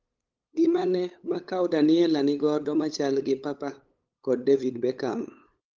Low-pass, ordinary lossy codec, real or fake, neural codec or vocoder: 7.2 kHz; Opus, 32 kbps; fake; codec, 16 kHz, 8 kbps, FunCodec, trained on LibriTTS, 25 frames a second